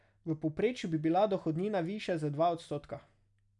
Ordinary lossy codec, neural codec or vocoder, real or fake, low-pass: none; none; real; 10.8 kHz